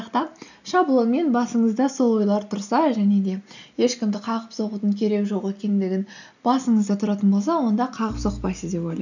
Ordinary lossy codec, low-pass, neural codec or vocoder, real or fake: none; 7.2 kHz; none; real